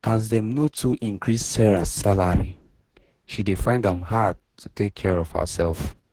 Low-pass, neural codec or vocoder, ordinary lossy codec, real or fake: 19.8 kHz; codec, 44.1 kHz, 2.6 kbps, DAC; Opus, 16 kbps; fake